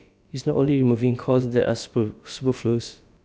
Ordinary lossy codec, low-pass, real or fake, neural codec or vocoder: none; none; fake; codec, 16 kHz, about 1 kbps, DyCAST, with the encoder's durations